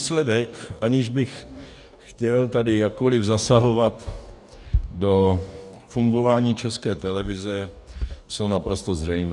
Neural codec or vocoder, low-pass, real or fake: codec, 44.1 kHz, 2.6 kbps, DAC; 10.8 kHz; fake